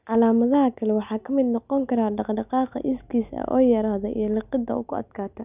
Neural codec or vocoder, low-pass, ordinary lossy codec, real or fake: none; 3.6 kHz; none; real